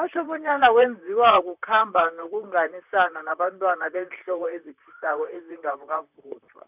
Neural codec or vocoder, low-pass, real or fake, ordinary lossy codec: vocoder, 44.1 kHz, 128 mel bands, Pupu-Vocoder; 3.6 kHz; fake; none